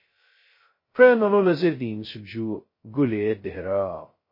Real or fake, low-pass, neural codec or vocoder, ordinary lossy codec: fake; 5.4 kHz; codec, 16 kHz, 0.2 kbps, FocalCodec; MP3, 24 kbps